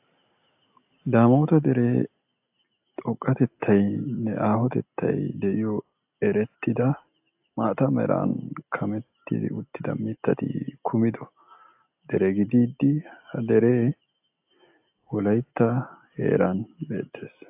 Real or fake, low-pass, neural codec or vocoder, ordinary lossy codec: real; 3.6 kHz; none; AAC, 32 kbps